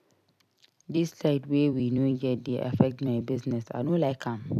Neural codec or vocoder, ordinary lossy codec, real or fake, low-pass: vocoder, 44.1 kHz, 128 mel bands every 256 samples, BigVGAN v2; none; fake; 14.4 kHz